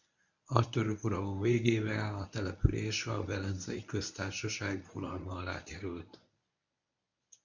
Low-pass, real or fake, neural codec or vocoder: 7.2 kHz; fake; codec, 24 kHz, 0.9 kbps, WavTokenizer, medium speech release version 1